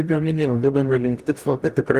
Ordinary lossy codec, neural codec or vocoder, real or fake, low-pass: Opus, 24 kbps; codec, 44.1 kHz, 0.9 kbps, DAC; fake; 14.4 kHz